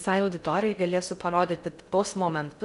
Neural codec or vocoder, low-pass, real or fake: codec, 16 kHz in and 24 kHz out, 0.6 kbps, FocalCodec, streaming, 4096 codes; 10.8 kHz; fake